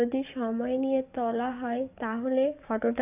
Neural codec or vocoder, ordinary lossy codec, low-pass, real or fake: codec, 44.1 kHz, 7.8 kbps, DAC; none; 3.6 kHz; fake